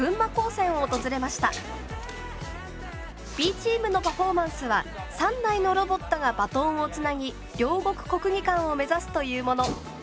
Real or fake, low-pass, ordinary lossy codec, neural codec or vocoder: real; none; none; none